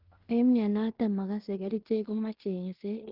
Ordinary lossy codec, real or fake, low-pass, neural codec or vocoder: Opus, 16 kbps; fake; 5.4 kHz; codec, 16 kHz in and 24 kHz out, 0.9 kbps, LongCat-Audio-Codec, fine tuned four codebook decoder